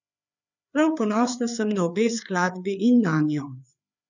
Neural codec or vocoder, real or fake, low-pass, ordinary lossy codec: codec, 16 kHz, 4 kbps, FreqCodec, larger model; fake; 7.2 kHz; none